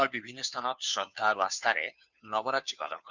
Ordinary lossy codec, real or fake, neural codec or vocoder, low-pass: none; fake; codec, 16 kHz, 2 kbps, FunCodec, trained on Chinese and English, 25 frames a second; 7.2 kHz